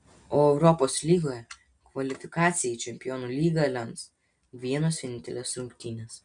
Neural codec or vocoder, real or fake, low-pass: none; real; 9.9 kHz